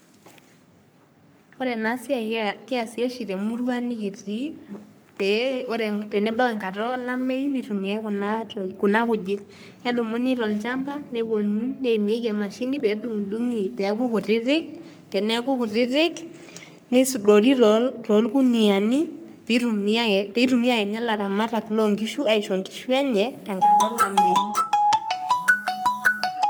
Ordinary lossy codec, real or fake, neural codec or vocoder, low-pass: none; fake; codec, 44.1 kHz, 3.4 kbps, Pupu-Codec; none